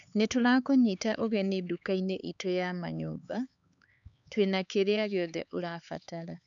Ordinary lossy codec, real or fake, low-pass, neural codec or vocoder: none; fake; 7.2 kHz; codec, 16 kHz, 4 kbps, X-Codec, HuBERT features, trained on LibriSpeech